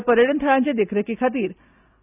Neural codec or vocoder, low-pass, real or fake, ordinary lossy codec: none; 3.6 kHz; real; none